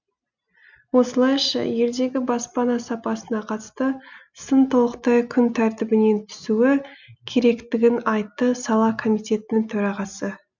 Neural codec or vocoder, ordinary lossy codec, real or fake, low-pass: none; none; real; 7.2 kHz